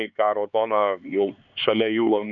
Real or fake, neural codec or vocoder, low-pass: fake; codec, 16 kHz, 4 kbps, X-Codec, HuBERT features, trained on LibriSpeech; 7.2 kHz